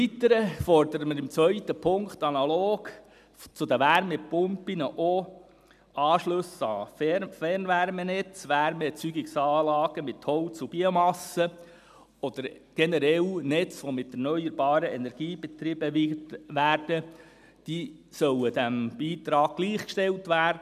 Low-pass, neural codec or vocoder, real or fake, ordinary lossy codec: 14.4 kHz; none; real; none